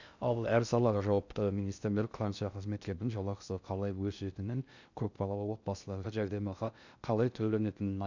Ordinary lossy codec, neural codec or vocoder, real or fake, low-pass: none; codec, 16 kHz in and 24 kHz out, 0.6 kbps, FocalCodec, streaming, 2048 codes; fake; 7.2 kHz